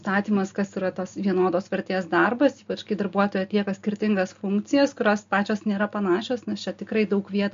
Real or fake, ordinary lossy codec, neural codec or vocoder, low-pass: real; MP3, 48 kbps; none; 7.2 kHz